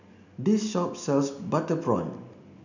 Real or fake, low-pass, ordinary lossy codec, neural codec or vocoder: real; 7.2 kHz; none; none